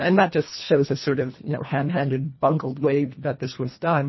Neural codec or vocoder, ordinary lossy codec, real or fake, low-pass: codec, 24 kHz, 1.5 kbps, HILCodec; MP3, 24 kbps; fake; 7.2 kHz